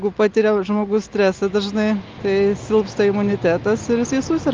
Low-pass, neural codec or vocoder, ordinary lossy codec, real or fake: 7.2 kHz; none; Opus, 32 kbps; real